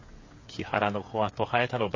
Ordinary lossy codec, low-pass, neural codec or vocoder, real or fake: MP3, 32 kbps; 7.2 kHz; codec, 16 kHz, 8 kbps, FreqCodec, smaller model; fake